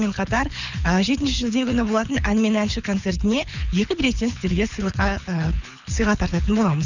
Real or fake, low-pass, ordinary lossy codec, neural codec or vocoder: fake; 7.2 kHz; none; codec, 24 kHz, 6 kbps, HILCodec